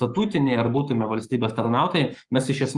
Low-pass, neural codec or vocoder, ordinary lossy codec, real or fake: 10.8 kHz; codec, 44.1 kHz, 7.8 kbps, Pupu-Codec; Opus, 32 kbps; fake